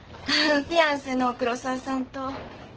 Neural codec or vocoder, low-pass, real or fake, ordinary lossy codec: codec, 44.1 kHz, 7.8 kbps, DAC; 7.2 kHz; fake; Opus, 16 kbps